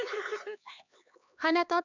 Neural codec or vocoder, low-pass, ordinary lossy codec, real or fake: codec, 16 kHz, 2 kbps, X-Codec, HuBERT features, trained on LibriSpeech; 7.2 kHz; none; fake